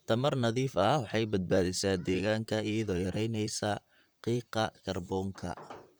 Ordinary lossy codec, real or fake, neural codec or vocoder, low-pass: none; fake; vocoder, 44.1 kHz, 128 mel bands, Pupu-Vocoder; none